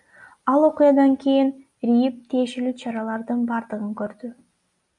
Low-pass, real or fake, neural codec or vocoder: 10.8 kHz; real; none